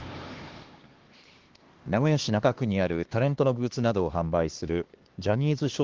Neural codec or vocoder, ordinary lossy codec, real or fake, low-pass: codec, 16 kHz, 2 kbps, X-Codec, HuBERT features, trained on LibriSpeech; Opus, 16 kbps; fake; 7.2 kHz